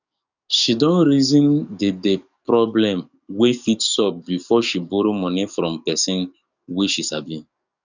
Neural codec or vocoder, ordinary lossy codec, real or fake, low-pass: codec, 44.1 kHz, 7.8 kbps, DAC; none; fake; 7.2 kHz